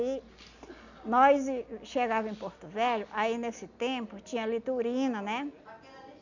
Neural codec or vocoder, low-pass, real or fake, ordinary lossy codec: none; 7.2 kHz; real; none